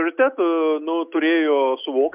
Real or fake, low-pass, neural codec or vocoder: real; 3.6 kHz; none